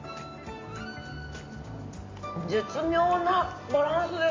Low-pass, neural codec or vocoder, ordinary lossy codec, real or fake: 7.2 kHz; none; AAC, 32 kbps; real